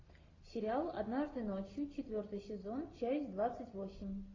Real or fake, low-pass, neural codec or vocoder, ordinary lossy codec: real; 7.2 kHz; none; AAC, 32 kbps